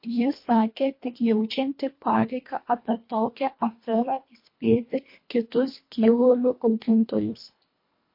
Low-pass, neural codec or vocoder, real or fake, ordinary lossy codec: 5.4 kHz; codec, 24 kHz, 1.5 kbps, HILCodec; fake; MP3, 32 kbps